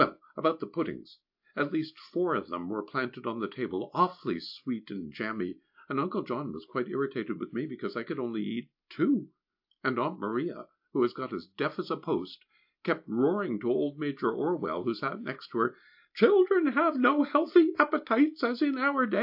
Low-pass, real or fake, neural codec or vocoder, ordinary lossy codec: 5.4 kHz; real; none; AAC, 48 kbps